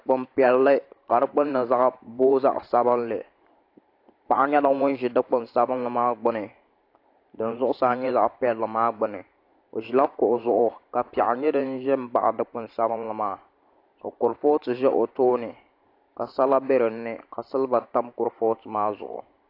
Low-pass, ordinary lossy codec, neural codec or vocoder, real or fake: 5.4 kHz; AAC, 32 kbps; vocoder, 44.1 kHz, 128 mel bands every 512 samples, BigVGAN v2; fake